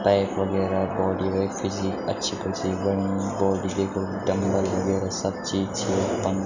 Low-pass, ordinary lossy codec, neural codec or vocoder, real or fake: 7.2 kHz; none; none; real